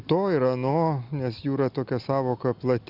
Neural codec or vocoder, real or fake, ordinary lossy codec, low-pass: none; real; Opus, 64 kbps; 5.4 kHz